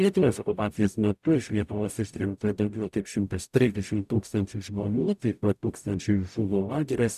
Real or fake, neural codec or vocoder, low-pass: fake; codec, 44.1 kHz, 0.9 kbps, DAC; 14.4 kHz